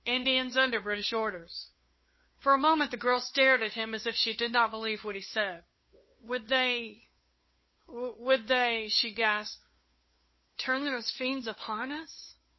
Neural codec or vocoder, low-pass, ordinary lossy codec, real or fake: codec, 16 kHz, 2 kbps, FunCodec, trained on Chinese and English, 25 frames a second; 7.2 kHz; MP3, 24 kbps; fake